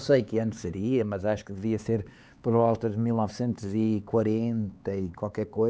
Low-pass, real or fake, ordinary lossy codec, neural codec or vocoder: none; fake; none; codec, 16 kHz, 4 kbps, X-Codec, HuBERT features, trained on LibriSpeech